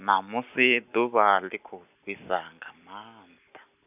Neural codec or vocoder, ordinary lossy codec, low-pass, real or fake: none; none; 3.6 kHz; real